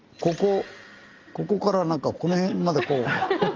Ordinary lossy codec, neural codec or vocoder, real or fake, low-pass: Opus, 24 kbps; none; real; 7.2 kHz